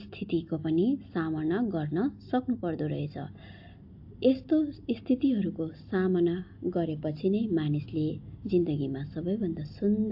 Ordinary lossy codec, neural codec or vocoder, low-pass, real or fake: AAC, 48 kbps; none; 5.4 kHz; real